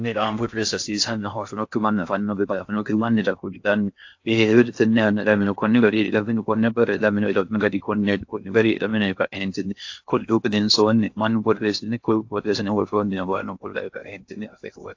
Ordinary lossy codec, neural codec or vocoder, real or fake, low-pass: AAC, 48 kbps; codec, 16 kHz in and 24 kHz out, 0.6 kbps, FocalCodec, streaming, 2048 codes; fake; 7.2 kHz